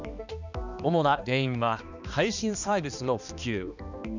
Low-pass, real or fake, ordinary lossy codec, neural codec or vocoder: 7.2 kHz; fake; none; codec, 16 kHz, 2 kbps, X-Codec, HuBERT features, trained on balanced general audio